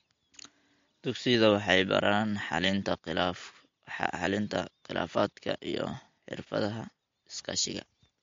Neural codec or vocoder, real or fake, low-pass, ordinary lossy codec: none; real; 7.2 kHz; MP3, 48 kbps